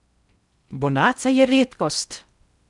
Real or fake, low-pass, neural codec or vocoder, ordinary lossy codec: fake; 10.8 kHz; codec, 16 kHz in and 24 kHz out, 0.6 kbps, FocalCodec, streaming, 4096 codes; none